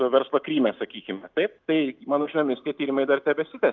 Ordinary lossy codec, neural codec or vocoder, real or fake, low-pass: Opus, 32 kbps; none; real; 7.2 kHz